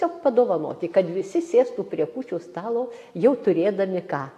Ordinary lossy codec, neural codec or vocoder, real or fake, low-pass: AAC, 64 kbps; none; real; 14.4 kHz